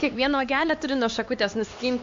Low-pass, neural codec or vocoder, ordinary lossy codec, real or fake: 7.2 kHz; codec, 16 kHz, 2 kbps, X-Codec, HuBERT features, trained on LibriSpeech; AAC, 48 kbps; fake